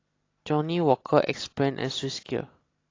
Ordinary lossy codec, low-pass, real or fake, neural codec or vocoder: AAC, 32 kbps; 7.2 kHz; real; none